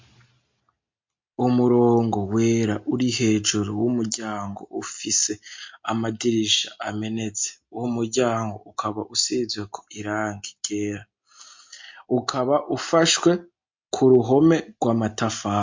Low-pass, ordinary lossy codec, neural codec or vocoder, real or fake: 7.2 kHz; MP3, 48 kbps; none; real